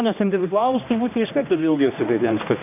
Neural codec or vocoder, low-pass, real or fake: codec, 16 kHz, 1 kbps, X-Codec, HuBERT features, trained on general audio; 3.6 kHz; fake